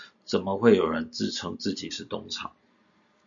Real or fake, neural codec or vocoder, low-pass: real; none; 7.2 kHz